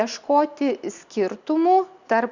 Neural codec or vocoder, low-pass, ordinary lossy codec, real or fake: none; 7.2 kHz; Opus, 64 kbps; real